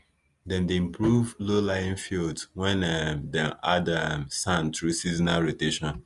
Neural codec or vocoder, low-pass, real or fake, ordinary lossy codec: none; 10.8 kHz; real; Opus, 32 kbps